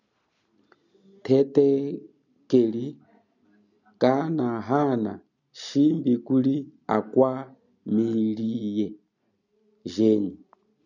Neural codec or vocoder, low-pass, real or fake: none; 7.2 kHz; real